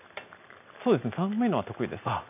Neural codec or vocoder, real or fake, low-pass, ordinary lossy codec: none; real; 3.6 kHz; none